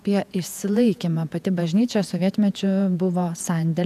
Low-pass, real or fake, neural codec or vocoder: 14.4 kHz; fake; vocoder, 48 kHz, 128 mel bands, Vocos